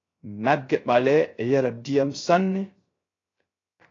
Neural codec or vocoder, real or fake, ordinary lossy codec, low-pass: codec, 16 kHz, 0.3 kbps, FocalCodec; fake; AAC, 32 kbps; 7.2 kHz